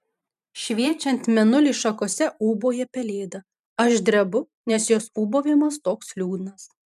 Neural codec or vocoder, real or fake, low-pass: vocoder, 44.1 kHz, 128 mel bands every 512 samples, BigVGAN v2; fake; 14.4 kHz